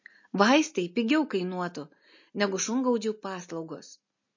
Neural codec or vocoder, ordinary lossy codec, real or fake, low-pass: none; MP3, 32 kbps; real; 7.2 kHz